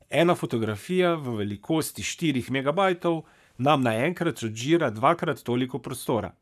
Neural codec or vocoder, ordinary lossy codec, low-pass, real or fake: codec, 44.1 kHz, 7.8 kbps, Pupu-Codec; none; 14.4 kHz; fake